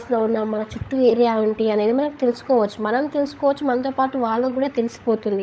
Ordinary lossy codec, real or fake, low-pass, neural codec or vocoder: none; fake; none; codec, 16 kHz, 16 kbps, FunCodec, trained on LibriTTS, 50 frames a second